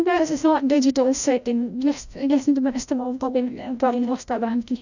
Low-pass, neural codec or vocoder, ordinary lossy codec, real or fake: 7.2 kHz; codec, 16 kHz, 0.5 kbps, FreqCodec, larger model; none; fake